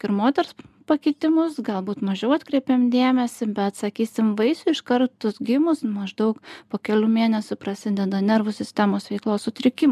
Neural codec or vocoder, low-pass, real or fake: none; 14.4 kHz; real